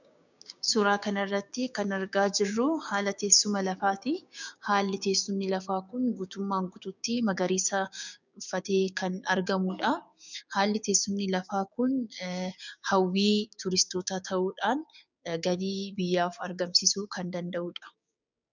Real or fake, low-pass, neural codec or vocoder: fake; 7.2 kHz; codec, 16 kHz, 6 kbps, DAC